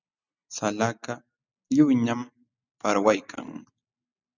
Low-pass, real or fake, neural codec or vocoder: 7.2 kHz; real; none